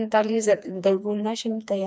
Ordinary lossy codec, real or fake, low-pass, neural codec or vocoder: none; fake; none; codec, 16 kHz, 2 kbps, FreqCodec, smaller model